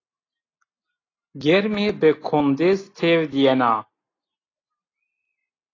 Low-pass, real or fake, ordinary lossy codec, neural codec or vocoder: 7.2 kHz; real; AAC, 32 kbps; none